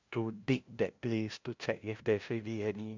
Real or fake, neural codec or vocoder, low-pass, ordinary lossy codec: fake; codec, 16 kHz, 0.5 kbps, FunCodec, trained on LibriTTS, 25 frames a second; 7.2 kHz; MP3, 64 kbps